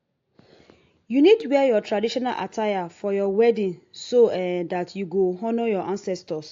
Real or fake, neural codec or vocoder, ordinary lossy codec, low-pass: real; none; AAC, 48 kbps; 7.2 kHz